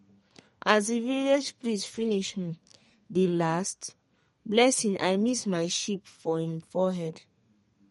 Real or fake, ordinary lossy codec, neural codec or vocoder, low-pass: fake; MP3, 48 kbps; codec, 32 kHz, 1.9 kbps, SNAC; 14.4 kHz